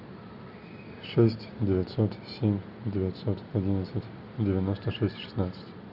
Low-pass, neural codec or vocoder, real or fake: 5.4 kHz; none; real